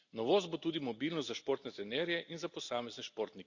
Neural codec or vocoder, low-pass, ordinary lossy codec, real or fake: none; 7.2 kHz; Opus, 64 kbps; real